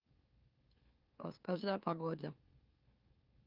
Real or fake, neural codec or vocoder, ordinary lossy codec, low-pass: fake; autoencoder, 44.1 kHz, a latent of 192 numbers a frame, MeloTTS; Opus, 32 kbps; 5.4 kHz